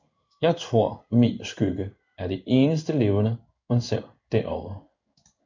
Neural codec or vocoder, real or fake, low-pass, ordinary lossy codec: codec, 16 kHz in and 24 kHz out, 1 kbps, XY-Tokenizer; fake; 7.2 kHz; MP3, 64 kbps